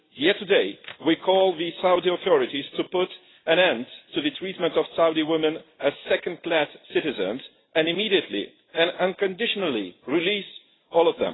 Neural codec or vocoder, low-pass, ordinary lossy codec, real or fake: none; 7.2 kHz; AAC, 16 kbps; real